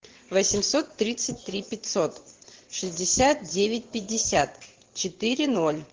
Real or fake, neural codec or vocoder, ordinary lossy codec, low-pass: fake; vocoder, 22.05 kHz, 80 mel bands, WaveNeXt; Opus, 16 kbps; 7.2 kHz